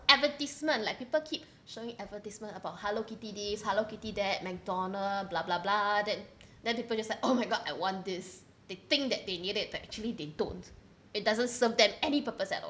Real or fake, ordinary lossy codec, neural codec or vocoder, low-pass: real; none; none; none